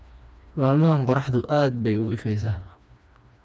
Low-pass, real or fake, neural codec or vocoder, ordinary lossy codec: none; fake; codec, 16 kHz, 2 kbps, FreqCodec, smaller model; none